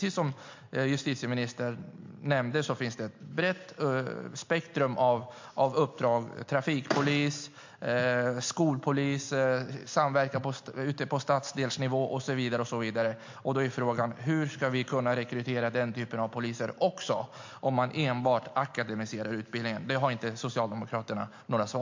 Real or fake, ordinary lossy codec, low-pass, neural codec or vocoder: real; MP3, 48 kbps; 7.2 kHz; none